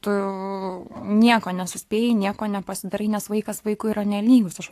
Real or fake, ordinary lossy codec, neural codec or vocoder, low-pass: fake; AAC, 64 kbps; codec, 44.1 kHz, 7.8 kbps, Pupu-Codec; 14.4 kHz